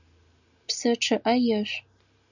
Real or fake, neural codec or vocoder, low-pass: real; none; 7.2 kHz